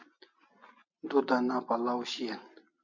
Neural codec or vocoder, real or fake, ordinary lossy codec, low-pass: none; real; MP3, 48 kbps; 7.2 kHz